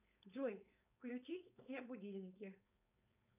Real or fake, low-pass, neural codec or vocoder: fake; 3.6 kHz; codec, 16 kHz, 4.8 kbps, FACodec